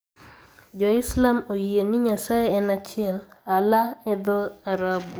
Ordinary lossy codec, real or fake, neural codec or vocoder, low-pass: none; fake; codec, 44.1 kHz, 7.8 kbps, DAC; none